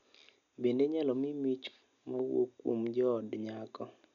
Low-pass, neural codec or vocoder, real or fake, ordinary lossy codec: 7.2 kHz; none; real; none